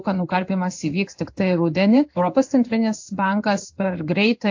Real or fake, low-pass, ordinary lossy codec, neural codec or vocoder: fake; 7.2 kHz; AAC, 48 kbps; codec, 16 kHz in and 24 kHz out, 1 kbps, XY-Tokenizer